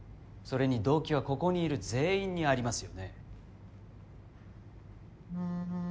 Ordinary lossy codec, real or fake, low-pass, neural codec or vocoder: none; real; none; none